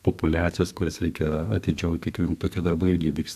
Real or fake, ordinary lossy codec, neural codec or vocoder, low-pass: fake; AAC, 96 kbps; codec, 32 kHz, 1.9 kbps, SNAC; 14.4 kHz